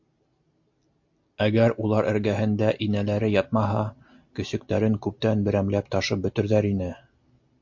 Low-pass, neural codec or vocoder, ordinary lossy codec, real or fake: 7.2 kHz; none; MP3, 48 kbps; real